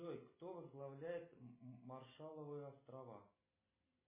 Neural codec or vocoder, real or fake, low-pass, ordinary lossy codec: none; real; 3.6 kHz; MP3, 16 kbps